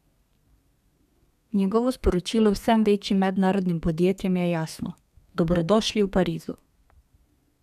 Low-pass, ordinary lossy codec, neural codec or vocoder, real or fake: 14.4 kHz; none; codec, 32 kHz, 1.9 kbps, SNAC; fake